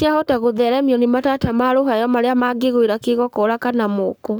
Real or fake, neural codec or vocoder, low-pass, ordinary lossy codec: fake; codec, 44.1 kHz, 7.8 kbps, Pupu-Codec; none; none